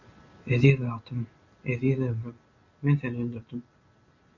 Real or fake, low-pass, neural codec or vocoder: real; 7.2 kHz; none